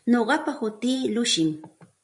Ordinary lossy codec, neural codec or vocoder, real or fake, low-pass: MP3, 64 kbps; vocoder, 44.1 kHz, 128 mel bands every 512 samples, BigVGAN v2; fake; 10.8 kHz